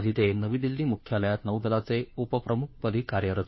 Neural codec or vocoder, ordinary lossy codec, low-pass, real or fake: codec, 16 kHz, 2 kbps, FunCodec, trained on Chinese and English, 25 frames a second; MP3, 24 kbps; 7.2 kHz; fake